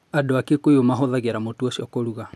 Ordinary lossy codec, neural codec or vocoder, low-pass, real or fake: none; none; none; real